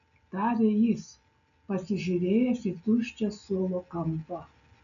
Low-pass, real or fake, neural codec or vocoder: 7.2 kHz; real; none